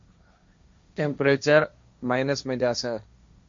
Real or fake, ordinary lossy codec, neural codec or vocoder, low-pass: fake; MP3, 48 kbps; codec, 16 kHz, 1.1 kbps, Voila-Tokenizer; 7.2 kHz